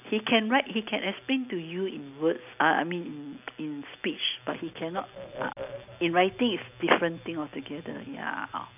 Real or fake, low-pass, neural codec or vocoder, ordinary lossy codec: real; 3.6 kHz; none; none